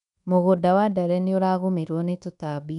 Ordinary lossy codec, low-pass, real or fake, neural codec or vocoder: none; 10.8 kHz; fake; codec, 24 kHz, 1.2 kbps, DualCodec